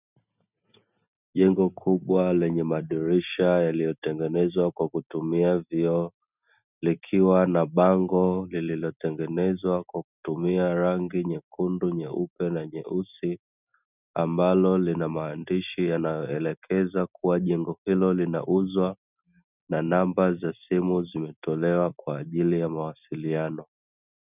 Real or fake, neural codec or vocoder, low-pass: real; none; 3.6 kHz